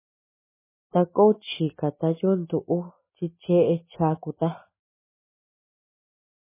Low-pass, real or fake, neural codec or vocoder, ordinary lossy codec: 3.6 kHz; real; none; MP3, 16 kbps